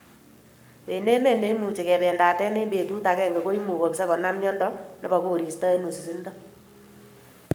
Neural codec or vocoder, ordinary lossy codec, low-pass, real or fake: codec, 44.1 kHz, 7.8 kbps, Pupu-Codec; none; none; fake